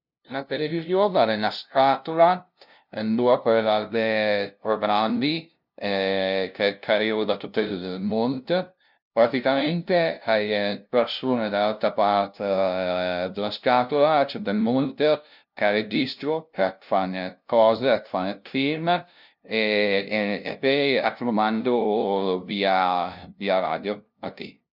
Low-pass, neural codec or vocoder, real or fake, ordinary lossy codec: 5.4 kHz; codec, 16 kHz, 0.5 kbps, FunCodec, trained on LibriTTS, 25 frames a second; fake; none